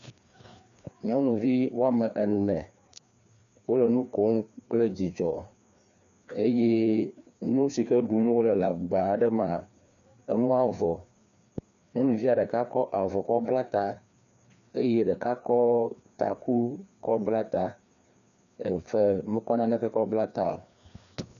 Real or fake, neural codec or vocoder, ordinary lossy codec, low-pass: fake; codec, 16 kHz, 2 kbps, FreqCodec, larger model; MP3, 96 kbps; 7.2 kHz